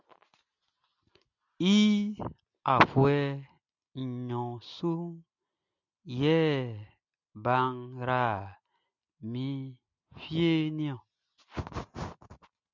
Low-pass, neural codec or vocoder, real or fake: 7.2 kHz; none; real